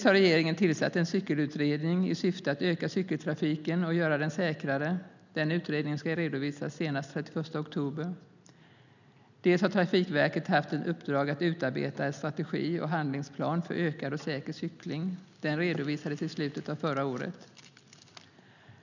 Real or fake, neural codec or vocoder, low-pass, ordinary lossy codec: real; none; 7.2 kHz; none